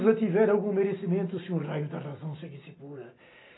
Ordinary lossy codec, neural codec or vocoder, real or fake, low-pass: AAC, 16 kbps; none; real; 7.2 kHz